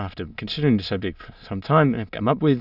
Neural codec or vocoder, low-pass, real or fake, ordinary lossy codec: autoencoder, 22.05 kHz, a latent of 192 numbers a frame, VITS, trained on many speakers; 5.4 kHz; fake; Opus, 64 kbps